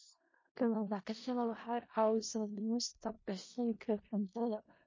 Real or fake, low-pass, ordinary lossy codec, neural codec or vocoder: fake; 7.2 kHz; MP3, 32 kbps; codec, 16 kHz in and 24 kHz out, 0.4 kbps, LongCat-Audio-Codec, four codebook decoder